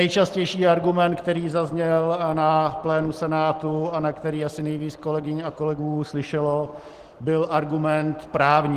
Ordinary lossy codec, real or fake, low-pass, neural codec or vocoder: Opus, 16 kbps; real; 14.4 kHz; none